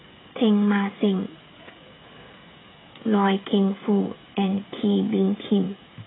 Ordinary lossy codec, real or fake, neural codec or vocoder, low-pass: AAC, 16 kbps; fake; codec, 16 kHz, 16 kbps, FreqCodec, smaller model; 7.2 kHz